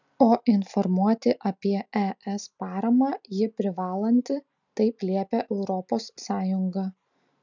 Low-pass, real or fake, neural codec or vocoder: 7.2 kHz; real; none